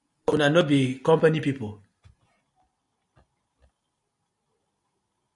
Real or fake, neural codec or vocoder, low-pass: real; none; 10.8 kHz